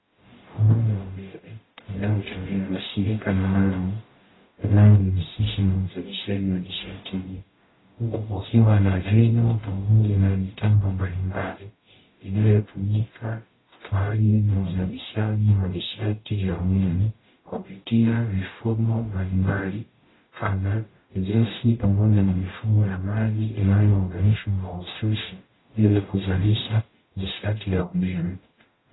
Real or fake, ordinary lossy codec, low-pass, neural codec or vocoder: fake; AAC, 16 kbps; 7.2 kHz; codec, 44.1 kHz, 0.9 kbps, DAC